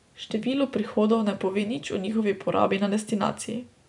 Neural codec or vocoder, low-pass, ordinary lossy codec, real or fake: none; 10.8 kHz; none; real